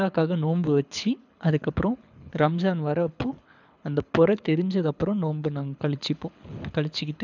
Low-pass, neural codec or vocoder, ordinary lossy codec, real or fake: 7.2 kHz; codec, 24 kHz, 6 kbps, HILCodec; none; fake